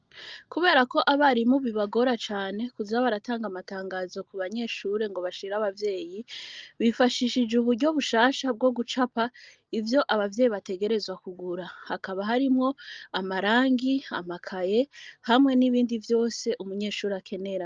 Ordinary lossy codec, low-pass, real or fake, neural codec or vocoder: Opus, 24 kbps; 7.2 kHz; real; none